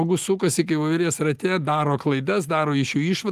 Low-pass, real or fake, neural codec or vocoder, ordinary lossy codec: 14.4 kHz; real; none; Opus, 32 kbps